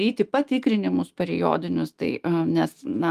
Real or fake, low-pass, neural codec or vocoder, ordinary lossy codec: fake; 14.4 kHz; autoencoder, 48 kHz, 128 numbers a frame, DAC-VAE, trained on Japanese speech; Opus, 24 kbps